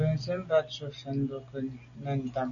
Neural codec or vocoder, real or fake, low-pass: none; real; 7.2 kHz